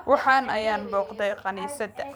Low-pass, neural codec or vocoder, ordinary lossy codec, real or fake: none; vocoder, 44.1 kHz, 128 mel bands every 512 samples, BigVGAN v2; none; fake